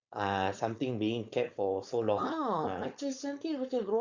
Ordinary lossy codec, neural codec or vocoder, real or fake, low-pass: Opus, 64 kbps; codec, 16 kHz, 4.8 kbps, FACodec; fake; 7.2 kHz